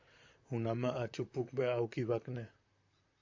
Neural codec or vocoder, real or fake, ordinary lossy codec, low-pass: vocoder, 44.1 kHz, 128 mel bands, Pupu-Vocoder; fake; AAC, 48 kbps; 7.2 kHz